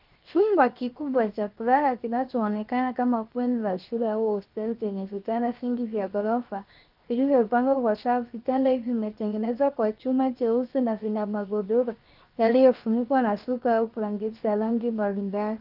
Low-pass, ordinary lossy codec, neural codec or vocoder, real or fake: 5.4 kHz; Opus, 24 kbps; codec, 16 kHz, 0.7 kbps, FocalCodec; fake